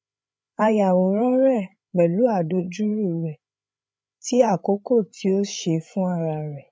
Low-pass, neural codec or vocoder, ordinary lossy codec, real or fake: none; codec, 16 kHz, 8 kbps, FreqCodec, larger model; none; fake